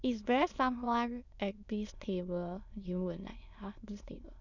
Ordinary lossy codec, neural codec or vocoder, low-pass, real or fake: none; autoencoder, 22.05 kHz, a latent of 192 numbers a frame, VITS, trained on many speakers; 7.2 kHz; fake